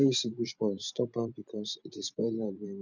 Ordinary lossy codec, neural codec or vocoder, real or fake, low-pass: none; vocoder, 44.1 kHz, 128 mel bands every 512 samples, BigVGAN v2; fake; 7.2 kHz